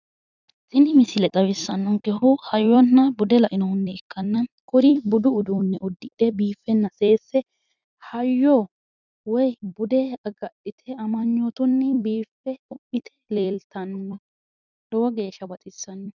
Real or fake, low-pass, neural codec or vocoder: fake; 7.2 kHz; vocoder, 44.1 kHz, 128 mel bands every 256 samples, BigVGAN v2